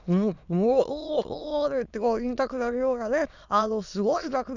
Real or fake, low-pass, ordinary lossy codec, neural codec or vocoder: fake; 7.2 kHz; none; autoencoder, 22.05 kHz, a latent of 192 numbers a frame, VITS, trained on many speakers